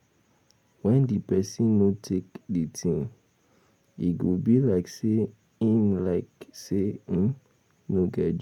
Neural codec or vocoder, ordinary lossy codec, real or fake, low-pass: none; none; real; 19.8 kHz